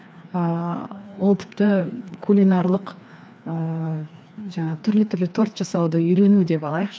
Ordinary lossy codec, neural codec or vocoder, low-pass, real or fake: none; codec, 16 kHz, 2 kbps, FreqCodec, larger model; none; fake